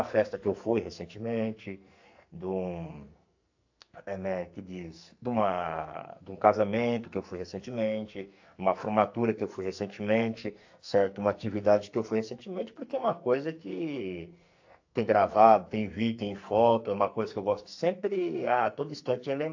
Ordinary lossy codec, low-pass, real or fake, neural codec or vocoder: AAC, 48 kbps; 7.2 kHz; fake; codec, 44.1 kHz, 2.6 kbps, SNAC